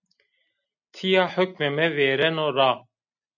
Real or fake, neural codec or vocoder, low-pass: real; none; 7.2 kHz